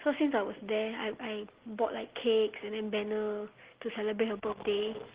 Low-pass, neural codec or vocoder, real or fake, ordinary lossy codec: 3.6 kHz; none; real; Opus, 16 kbps